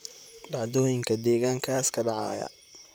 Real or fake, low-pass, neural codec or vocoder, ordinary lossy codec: fake; none; vocoder, 44.1 kHz, 128 mel bands, Pupu-Vocoder; none